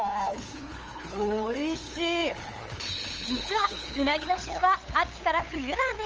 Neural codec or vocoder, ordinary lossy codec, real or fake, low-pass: codec, 16 kHz, 8 kbps, FunCodec, trained on LibriTTS, 25 frames a second; Opus, 24 kbps; fake; 7.2 kHz